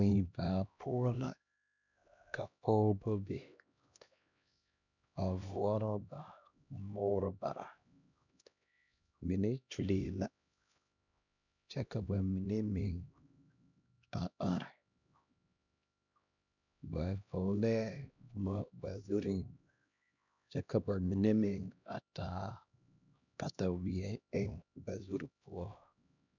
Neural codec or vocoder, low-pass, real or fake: codec, 16 kHz, 1 kbps, X-Codec, HuBERT features, trained on LibriSpeech; 7.2 kHz; fake